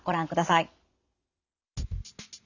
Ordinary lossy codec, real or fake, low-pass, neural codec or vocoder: none; real; 7.2 kHz; none